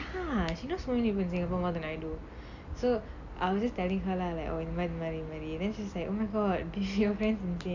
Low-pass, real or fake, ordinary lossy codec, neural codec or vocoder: 7.2 kHz; real; none; none